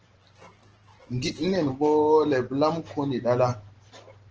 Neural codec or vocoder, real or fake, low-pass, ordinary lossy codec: none; real; 7.2 kHz; Opus, 16 kbps